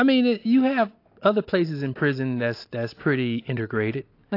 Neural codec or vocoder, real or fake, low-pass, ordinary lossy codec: none; real; 5.4 kHz; AAC, 32 kbps